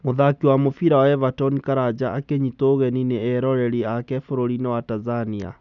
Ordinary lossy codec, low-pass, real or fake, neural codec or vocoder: none; 7.2 kHz; real; none